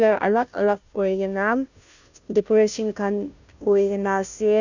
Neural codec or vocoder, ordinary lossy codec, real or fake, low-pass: codec, 16 kHz, 0.5 kbps, FunCodec, trained on Chinese and English, 25 frames a second; none; fake; 7.2 kHz